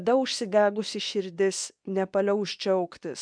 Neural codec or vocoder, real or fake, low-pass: codec, 24 kHz, 0.9 kbps, WavTokenizer, medium speech release version 2; fake; 9.9 kHz